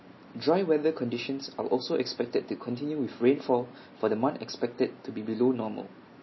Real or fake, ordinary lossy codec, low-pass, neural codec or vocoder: real; MP3, 24 kbps; 7.2 kHz; none